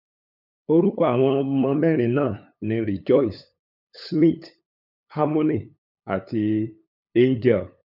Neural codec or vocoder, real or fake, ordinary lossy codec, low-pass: codec, 16 kHz, 8 kbps, FunCodec, trained on LibriTTS, 25 frames a second; fake; none; 5.4 kHz